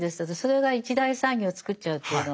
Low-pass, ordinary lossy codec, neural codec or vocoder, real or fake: none; none; none; real